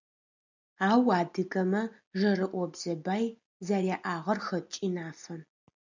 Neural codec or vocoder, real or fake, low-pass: none; real; 7.2 kHz